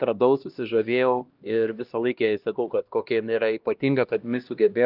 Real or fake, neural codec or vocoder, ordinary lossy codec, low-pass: fake; codec, 16 kHz, 1 kbps, X-Codec, HuBERT features, trained on LibriSpeech; Opus, 24 kbps; 5.4 kHz